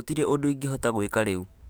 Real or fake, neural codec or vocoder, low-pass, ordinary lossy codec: fake; codec, 44.1 kHz, 7.8 kbps, DAC; none; none